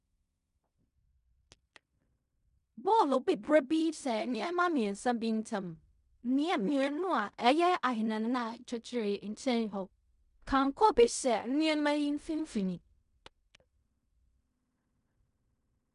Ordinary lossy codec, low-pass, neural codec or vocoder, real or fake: MP3, 96 kbps; 10.8 kHz; codec, 16 kHz in and 24 kHz out, 0.4 kbps, LongCat-Audio-Codec, fine tuned four codebook decoder; fake